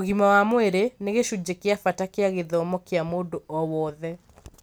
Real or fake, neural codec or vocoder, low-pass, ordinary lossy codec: real; none; none; none